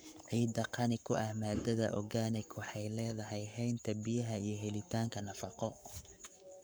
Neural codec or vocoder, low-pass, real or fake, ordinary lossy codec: codec, 44.1 kHz, 7.8 kbps, Pupu-Codec; none; fake; none